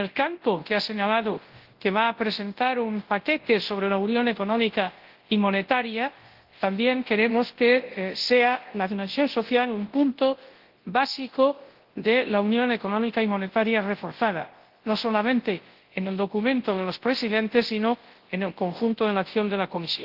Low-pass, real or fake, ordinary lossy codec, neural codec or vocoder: 5.4 kHz; fake; Opus, 16 kbps; codec, 24 kHz, 0.9 kbps, WavTokenizer, large speech release